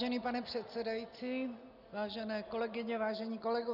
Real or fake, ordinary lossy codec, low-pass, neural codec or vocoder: real; Opus, 64 kbps; 5.4 kHz; none